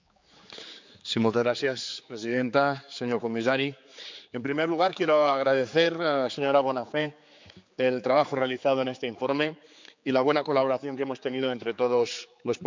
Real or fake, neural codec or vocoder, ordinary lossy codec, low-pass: fake; codec, 16 kHz, 4 kbps, X-Codec, HuBERT features, trained on general audio; MP3, 64 kbps; 7.2 kHz